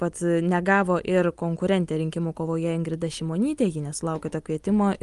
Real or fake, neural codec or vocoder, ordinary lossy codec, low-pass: real; none; Opus, 32 kbps; 10.8 kHz